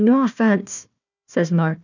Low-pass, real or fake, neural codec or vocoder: 7.2 kHz; fake; codec, 16 kHz, 1 kbps, FunCodec, trained on Chinese and English, 50 frames a second